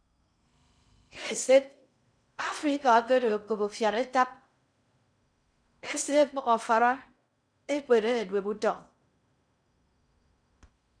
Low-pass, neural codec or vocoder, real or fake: 9.9 kHz; codec, 16 kHz in and 24 kHz out, 0.6 kbps, FocalCodec, streaming, 2048 codes; fake